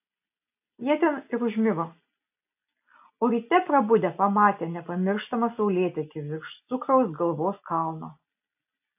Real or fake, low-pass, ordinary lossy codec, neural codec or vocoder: real; 3.6 kHz; AAC, 32 kbps; none